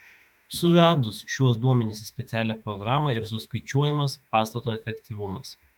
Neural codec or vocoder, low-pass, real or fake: autoencoder, 48 kHz, 32 numbers a frame, DAC-VAE, trained on Japanese speech; 19.8 kHz; fake